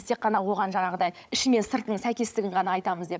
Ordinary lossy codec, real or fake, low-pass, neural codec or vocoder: none; fake; none; codec, 16 kHz, 16 kbps, FunCodec, trained on LibriTTS, 50 frames a second